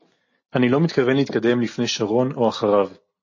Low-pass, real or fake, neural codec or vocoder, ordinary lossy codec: 7.2 kHz; real; none; MP3, 32 kbps